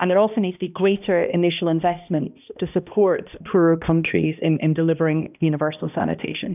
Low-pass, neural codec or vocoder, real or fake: 3.6 kHz; codec, 16 kHz, 1 kbps, X-Codec, HuBERT features, trained on balanced general audio; fake